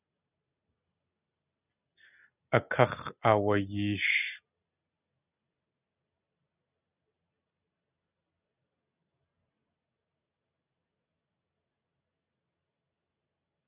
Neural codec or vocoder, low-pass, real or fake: none; 3.6 kHz; real